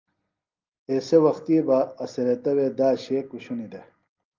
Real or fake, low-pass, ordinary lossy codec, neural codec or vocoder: real; 7.2 kHz; Opus, 24 kbps; none